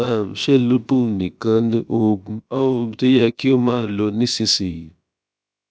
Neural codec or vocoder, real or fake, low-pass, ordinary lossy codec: codec, 16 kHz, about 1 kbps, DyCAST, with the encoder's durations; fake; none; none